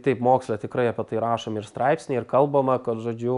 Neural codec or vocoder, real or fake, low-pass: none; real; 10.8 kHz